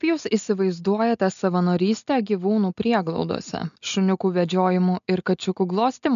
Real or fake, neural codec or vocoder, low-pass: real; none; 7.2 kHz